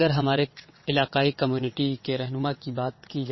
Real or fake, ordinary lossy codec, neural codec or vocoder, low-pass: fake; MP3, 24 kbps; vocoder, 22.05 kHz, 80 mel bands, WaveNeXt; 7.2 kHz